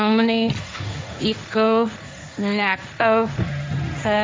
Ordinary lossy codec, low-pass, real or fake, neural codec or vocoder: none; none; fake; codec, 16 kHz, 1.1 kbps, Voila-Tokenizer